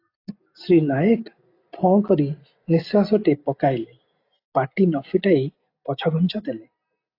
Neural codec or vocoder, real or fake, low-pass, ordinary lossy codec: none; real; 5.4 kHz; AAC, 32 kbps